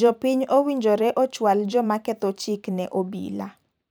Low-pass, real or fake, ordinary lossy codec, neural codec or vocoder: none; fake; none; vocoder, 44.1 kHz, 128 mel bands every 512 samples, BigVGAN v2